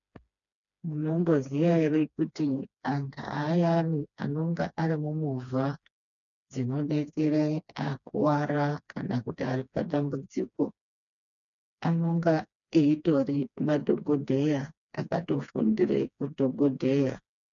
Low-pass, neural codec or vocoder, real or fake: 7.2 kHz; codec, 16 kHz, 2 kbps, FreqCodec, smaller model; fake